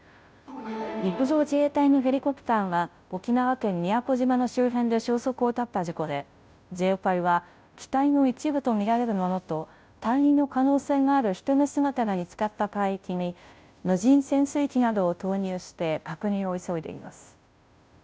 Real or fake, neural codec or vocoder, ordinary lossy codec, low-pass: fake; codec, 16 kHz, 0.5 kbps, FunCodec, trained on Chinese and English, 25 frames a second; none; none